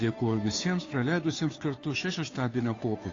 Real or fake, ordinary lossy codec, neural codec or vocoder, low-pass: fake; AAC, 32 kbps; codec, 16 kHz, 2 kbps, FunCodec, trained on Chinese and English, 25 frames a second; 7.2 kHz